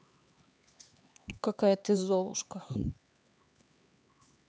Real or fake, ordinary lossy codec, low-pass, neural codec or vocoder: fake; none; none; codec, 16 kHz, 4 kbps, X-Codec, HuBERT features, trained on LibriSpeech